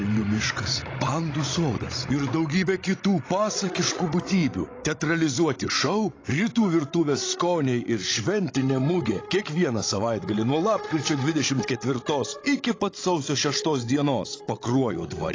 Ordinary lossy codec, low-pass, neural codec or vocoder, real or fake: AAC, 32 kbps; 7.2 kHz; codec, 16 kHz, 16 kbps, FreqCodec, larger model; fake